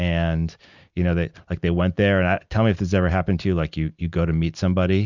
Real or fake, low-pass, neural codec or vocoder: real; 7.2 kHz; none